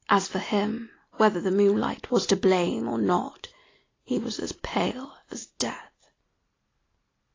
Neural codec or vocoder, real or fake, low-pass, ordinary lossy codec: none; real; 7.2 kHz; AAC, 32 kbps